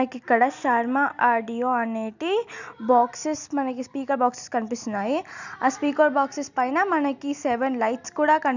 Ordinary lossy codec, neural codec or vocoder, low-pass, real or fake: none; none; 7.2 kHz; real